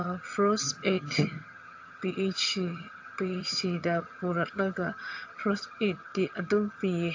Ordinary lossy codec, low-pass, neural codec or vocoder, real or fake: AAC, 48 kbps; 7.2 kHz; vocoder, 22.05 kHz, 80 mel bands, HiFi-GAN; fake